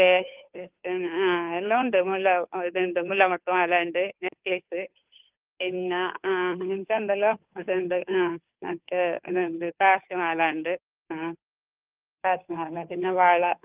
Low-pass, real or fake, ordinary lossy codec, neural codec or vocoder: 3.6 kHz; fake; Opus, 32 kbps; codec, 16 kHz, 2 kbps, FunCodec, trained on Chinese and English, 25 frames a second